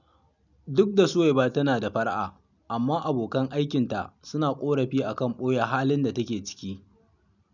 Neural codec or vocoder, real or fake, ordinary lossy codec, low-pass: none; real; none; 7.2 kHz